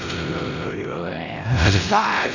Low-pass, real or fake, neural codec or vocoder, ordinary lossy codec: 7.2 kHz; fake; codec, 16 kHz, 0.5 kbps, X-Codec, WavLM features, trained on Multilingual LibriSpeech; none